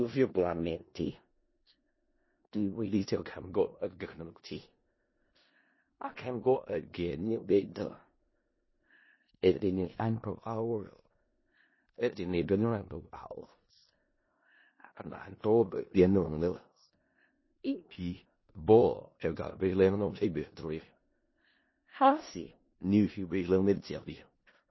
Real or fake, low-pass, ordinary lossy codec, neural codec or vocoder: fake; 7.2 kHz; MP3, 24 kbps; codec, 16 kHz in and 24 kHz out, 0.4 kbps, LongCat-Audio-Codec, four codebook decoder